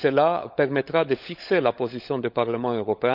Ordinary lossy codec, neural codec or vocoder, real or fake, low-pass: none; codec, 16 kHz, 16 kbps, FunCodec, trained on LibriTTS, 50 frames a second; fake; 5.4 kHz